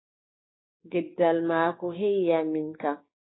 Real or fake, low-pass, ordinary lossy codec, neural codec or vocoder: fake; 7.2 kHz; AAC, 16 kbps; codec, 16 kHz, 6 kbps, DAC